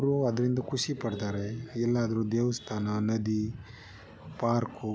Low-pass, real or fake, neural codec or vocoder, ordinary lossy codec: none; real; none; none